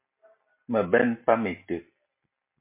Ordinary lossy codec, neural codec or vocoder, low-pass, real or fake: MP3, 24 kbps; none; 3.6 kHz; real